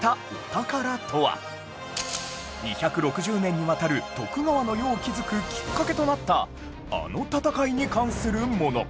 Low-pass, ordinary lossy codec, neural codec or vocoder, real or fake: none; none; none; real